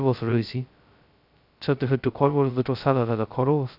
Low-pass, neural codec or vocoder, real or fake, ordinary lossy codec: 5.4 kHz; codec, 16 kHz, 0.2 kbps, FocalCodec; fake; none